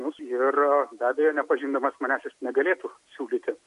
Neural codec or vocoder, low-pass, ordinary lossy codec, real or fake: none; 10.8 kHz; MP3, 64 kbps; real